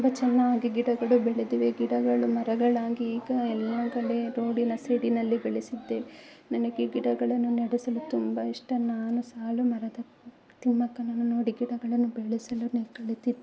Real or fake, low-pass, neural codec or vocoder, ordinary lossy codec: real; none; none; none